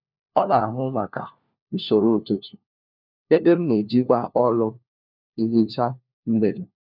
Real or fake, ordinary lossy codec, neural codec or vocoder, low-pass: fake; none; codec, 16 kHz, 1 kbps, FunCodec, trained on LibriTTS, 50 frames a second; 5.4 kHz